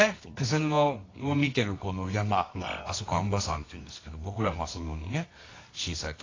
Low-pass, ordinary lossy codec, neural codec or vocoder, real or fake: 7.2 kHz; AAC, 32 kbps; codec, 24 kHz, 0.9 kbps, WavTokenizer, medium music audio release; fake